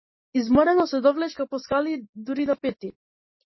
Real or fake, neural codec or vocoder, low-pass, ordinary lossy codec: real; none; 7.2 kHz; MP3, 24 kbps